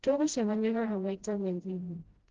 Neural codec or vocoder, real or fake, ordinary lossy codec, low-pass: codec, 16 kHz, 0.5 kbps, FreqCodec, smaller model; fake; Opus, 16 kbps; 7.2 kHz